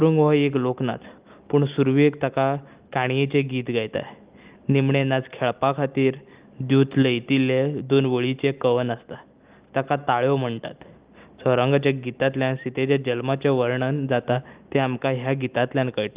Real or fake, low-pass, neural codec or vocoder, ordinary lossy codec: real; 3.6 kHz; none; Opus, 32 kbps